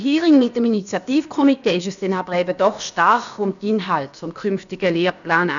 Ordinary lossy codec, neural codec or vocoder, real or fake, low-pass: none; codec, 16 kHz, about 1 kbps, DyCAST, with the encoder's durations; fake; 7.2 kHz